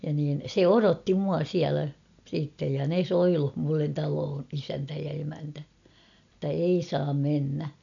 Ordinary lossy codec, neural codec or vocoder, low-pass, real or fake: none; none; 7.2 kHz; real